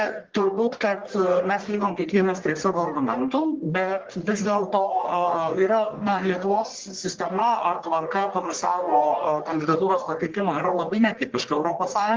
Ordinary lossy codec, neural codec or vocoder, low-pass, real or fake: Opus, 16 kbps; codec, 44.1 kHz, 1.7 kbps, Pupu-Codec; 7.2 kHz; fake